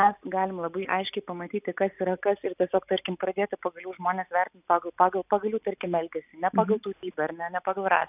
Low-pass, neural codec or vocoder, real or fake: 3.6 kHz; none; real